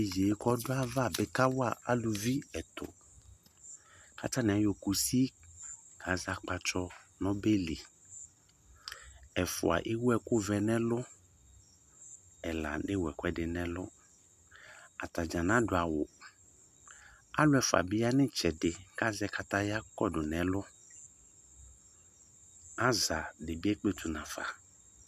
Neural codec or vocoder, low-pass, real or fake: none; 14.4 kHz; real